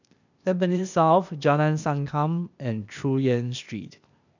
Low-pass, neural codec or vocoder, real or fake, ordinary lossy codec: 7.2 kHz; codec, 16 kHz, 0.8 kbps, ZipCodec; fake; none